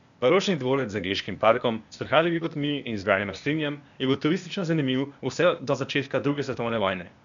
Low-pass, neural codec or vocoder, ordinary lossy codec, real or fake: 7.2 kHz; codec, 16 kHz, 0.8 kbps, ZipCodec; MP3, 96 kbps; fake